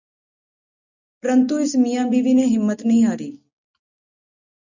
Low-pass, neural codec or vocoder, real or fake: 7.2 kHz; none; real